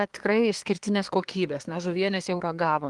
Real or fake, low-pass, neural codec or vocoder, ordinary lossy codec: fake; 10.8 kHz; codec, 24 kHz, 1 kbps, SNAC; Opus, 24 kbps